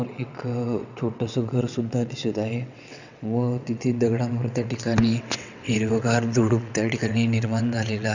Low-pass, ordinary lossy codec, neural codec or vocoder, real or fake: 7.2 kHz; none; vocoder, 22.05 kHz, 80 mel bands, WaveNeXt; fake